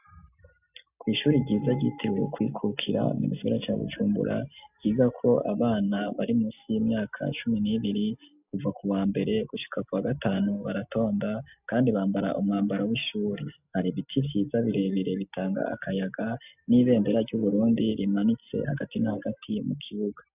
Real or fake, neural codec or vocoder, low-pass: real; none; 3.6 kHz